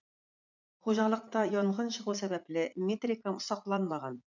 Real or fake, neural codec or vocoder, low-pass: fake; vocoder, 44.1 kHz, 80 mel bands, Vocos; 7.2 kHz